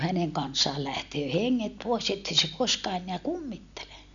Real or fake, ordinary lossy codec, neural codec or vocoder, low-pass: real; none; none; 7.2 kHz